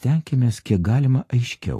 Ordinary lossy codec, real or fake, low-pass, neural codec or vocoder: AAC, 48 kbps; real; 14.4 kHz; none